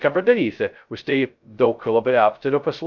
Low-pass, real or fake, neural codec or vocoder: 7.2 kHz; fake; codec, 16 kHz, 0.2 kbps, FocalCodec